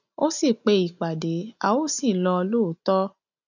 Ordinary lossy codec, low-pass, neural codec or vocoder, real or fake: none; 7.2 kHz; none; real